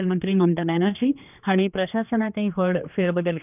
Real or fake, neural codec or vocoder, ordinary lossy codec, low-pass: fake; codec, 16 kHz, 2 kbps, X-Codec, HuBERT features, trained on general audio; none; 3.6 kHz